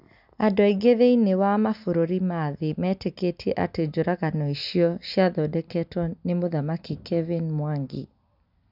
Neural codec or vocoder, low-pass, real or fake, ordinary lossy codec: none; 5.4 kHz; real; none